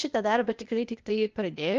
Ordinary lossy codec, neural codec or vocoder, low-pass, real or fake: Opus, 24 kbps; codec, 16 kHz, 0.8 kbps, ZipCodec; 7.2 kHz; fake